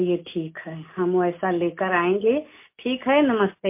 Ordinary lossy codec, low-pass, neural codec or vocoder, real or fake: MP3, 24 kbps; 3.6 kHz; none; real